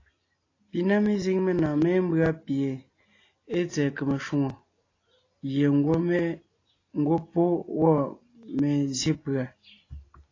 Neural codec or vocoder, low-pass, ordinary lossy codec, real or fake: none; 7.2 kHz; AAC, 32 kbps; real